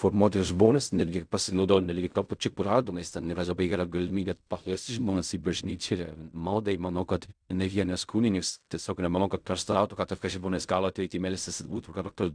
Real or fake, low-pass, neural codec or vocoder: fake; 9.9 kHz; codec, 16 kHz in and 24 kHz out, 0.4 kbps, LongCat-Audio-Codec, fine tuned four codebook decoder